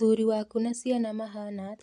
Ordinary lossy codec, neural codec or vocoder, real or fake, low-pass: AAC, 64 kbps; none; real; 10.8 kHz